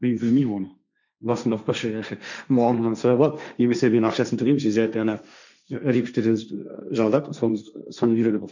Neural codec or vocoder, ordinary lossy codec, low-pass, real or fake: codec, 16 kHz, 1.1 kbps, Voila-Tokenizer; none; 7.2 kHz; fake